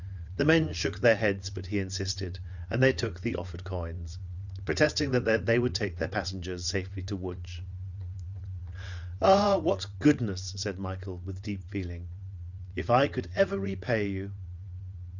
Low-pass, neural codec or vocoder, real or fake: 7.2 kHz; vocoder, 22.05 kHz, 80 mel bands, WaveNeXt; fake